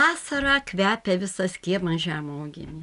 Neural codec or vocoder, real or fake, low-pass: none; real; 10.8 kHz